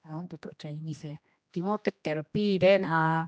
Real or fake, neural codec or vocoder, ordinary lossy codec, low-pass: fake; codec, 16 kHz, 1 kbps, X-Codec, HuBERT features, trained on general audio; none; none